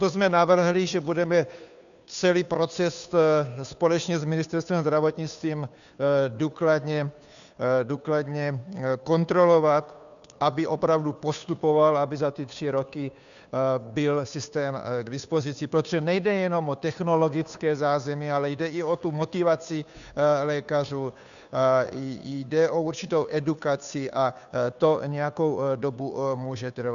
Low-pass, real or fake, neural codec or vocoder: 7.2 kHz; fake; codec, 16 kHz, 2 kbps, FunCodec, trained on Chinese and English, 25 frames a second